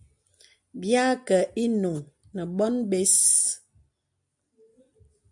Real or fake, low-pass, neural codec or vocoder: real; 10.8 kHz; none